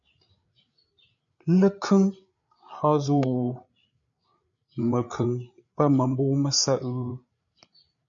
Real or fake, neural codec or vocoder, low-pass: fake; codec, 16 kHz, 8 kbps, FreqCodec, larger model; 7.2 kHz